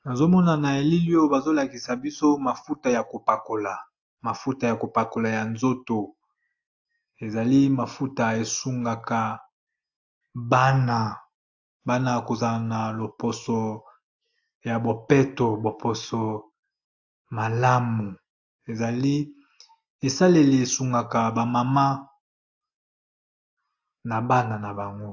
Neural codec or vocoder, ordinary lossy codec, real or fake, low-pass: none; AAC, 48 kbps; real; 7.2 kHz